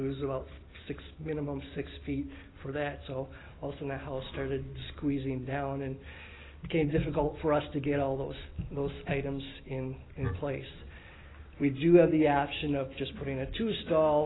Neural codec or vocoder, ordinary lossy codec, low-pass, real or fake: none; AAC, 16 kbps; 7.2 kHz; real